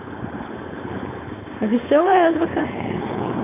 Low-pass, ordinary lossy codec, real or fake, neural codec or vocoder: 3.6 kHz; AAC, 24 kbps; fake; codec, 16 kHz, 4.8 kbps, FACodec